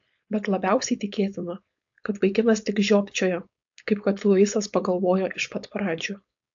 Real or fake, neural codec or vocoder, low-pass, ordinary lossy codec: fake; codec, 16 kHz, 4.8 kbps, FACodec; 7.2 kHz; AAC, 64 kbps